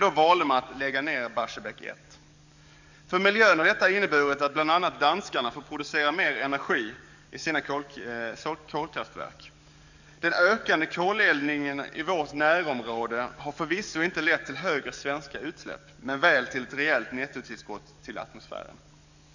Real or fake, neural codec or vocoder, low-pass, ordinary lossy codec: fake; codec, 44.1 kHz, 7.8 kbps, DAC; 7.2 kHz; none